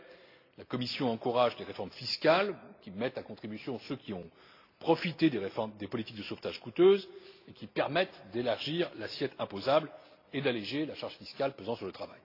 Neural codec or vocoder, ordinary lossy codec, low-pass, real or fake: none; AAC, 32 kbps; 5.4 kHz; real